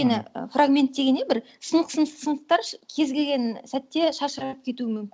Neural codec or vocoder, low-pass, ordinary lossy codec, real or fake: none; none; none; real